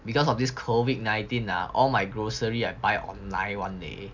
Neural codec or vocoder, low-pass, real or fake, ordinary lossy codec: none; 7.2 kHz; real; none